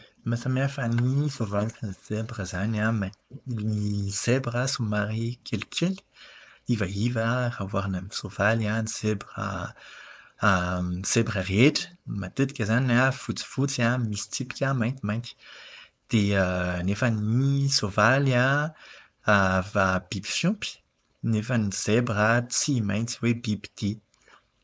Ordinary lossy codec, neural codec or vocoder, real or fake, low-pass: none; codec, 16 kHz, 4.8 kbps, FACodec; fake; none